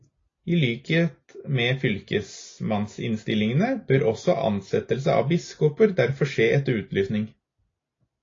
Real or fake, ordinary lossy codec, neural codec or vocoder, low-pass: real; AAC, 32 kbps; none; 7.2 kHz